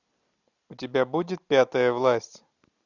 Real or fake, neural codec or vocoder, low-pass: real; none; 7.2 kHz